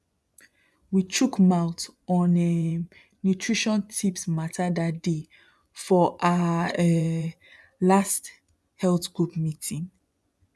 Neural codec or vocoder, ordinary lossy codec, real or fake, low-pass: vocoder, 24 kHz, 100 mel bands, Vocos; none; fake; none